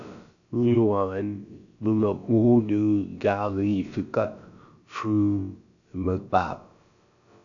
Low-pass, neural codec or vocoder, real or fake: 7.2 kHz; codec, 16 kHz, about 1 kbps, DyCAST, with the encoder's durations; fake